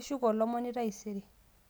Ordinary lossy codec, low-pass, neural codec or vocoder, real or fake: none; none; none; real